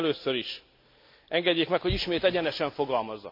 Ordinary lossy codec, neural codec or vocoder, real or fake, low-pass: MP3, 48 kbps; none; real; 5.4 kHz